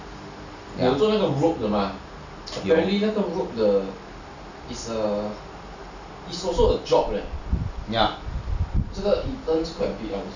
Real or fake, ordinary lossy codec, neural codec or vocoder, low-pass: real; none; none; 7.2 kHz